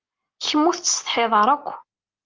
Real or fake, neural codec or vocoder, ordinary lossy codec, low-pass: real; none; Opus, 32 kbps; 7.2 kHz